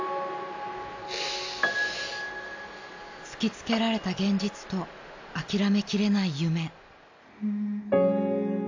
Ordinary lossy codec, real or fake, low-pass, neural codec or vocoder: AAC, 48 kbps; real; 7.2 kHz; none